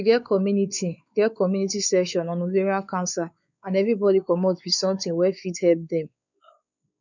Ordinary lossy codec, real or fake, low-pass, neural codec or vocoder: none; fake; 7.2 kHz; codec, 16 kHz, 4 kbps, X-Codec, WavLM features, trained on Multilingual LibriSpeech